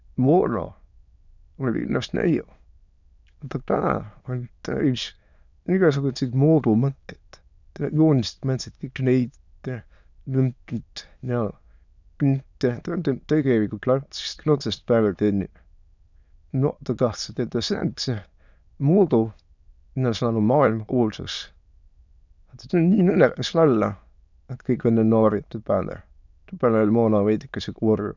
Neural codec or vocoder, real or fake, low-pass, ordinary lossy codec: autoencoder, 22.05 kHz, a latent of 192 numbers a frame, VITS, trained on many speakers; fake; 7.2 kHz; none